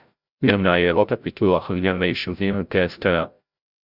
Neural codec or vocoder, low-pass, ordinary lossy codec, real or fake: codec, 16 kHz, 0.5 kbps, FreqCodec, larger model; 5.4 kHz; Opus, 64 kbps; fake